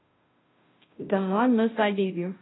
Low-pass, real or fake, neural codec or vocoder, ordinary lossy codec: 7.2 kHz; fake; codec, 16 kHz, 0.5 kbps, FunCodec, trained on Chinese and English, 25 frames a second; AAC, 16 kbps